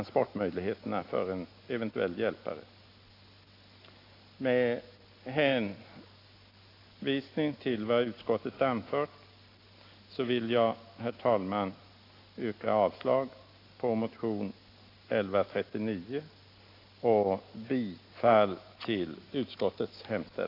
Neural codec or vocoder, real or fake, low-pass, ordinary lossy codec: none; real; 5.4 kHz; AAC, 32 kbps